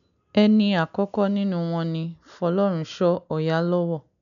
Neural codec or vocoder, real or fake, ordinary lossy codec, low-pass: none; real; none; 7.2 kHz